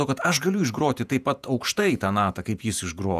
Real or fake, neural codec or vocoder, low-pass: fake; vocoder, 48 kHz, 128 mel bands, Vocos; 14.4 kHz